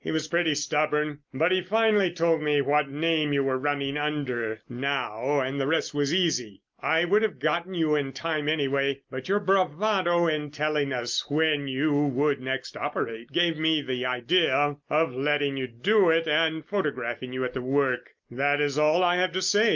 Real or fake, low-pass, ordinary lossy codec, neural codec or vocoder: real; 7.2 kHz; Opus, 24 kbps; none